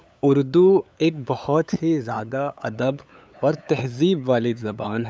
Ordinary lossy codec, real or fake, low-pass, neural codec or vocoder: none; fake; none; codec, 16 kHz, 8 kbps, FunCodec, trained on LibriTTS, 25 frames a second